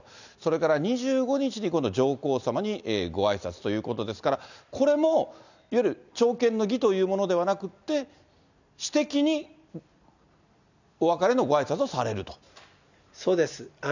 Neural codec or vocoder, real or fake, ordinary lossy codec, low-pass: none; real; none; 7.2 kHz